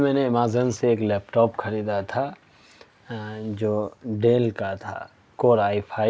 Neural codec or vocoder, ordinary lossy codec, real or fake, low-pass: none; none; real; none